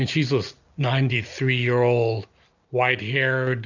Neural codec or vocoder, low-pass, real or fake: none; 7.2 kHz; real